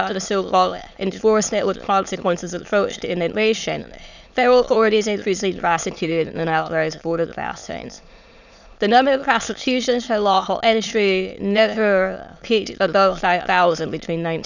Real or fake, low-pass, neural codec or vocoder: fake; 7.2 kHz; autoencoder, 22.05 kHz, a latent of 192 numbers a frame, VITS, trained on many speakers